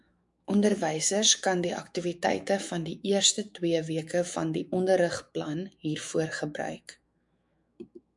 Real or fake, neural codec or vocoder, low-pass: fake; autoencoder, 48 kHz, 128 numbers a frame, DAC-VAE, trained on Japanese speech; 10.8 kHz